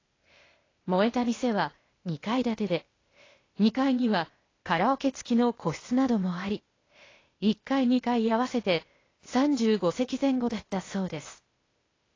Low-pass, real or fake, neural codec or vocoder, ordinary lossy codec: 7.2 kHz; fake; codec, 16 kHz, 0.8 kbps, ZipCodec; AAC, 32 kbps